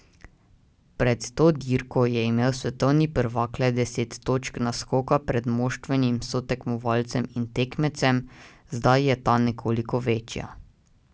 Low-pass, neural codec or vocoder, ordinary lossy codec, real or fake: none; none; none; real